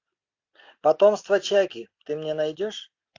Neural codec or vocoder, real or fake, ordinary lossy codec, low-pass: none; real; AAC, 48 kbps; 7.2 kHz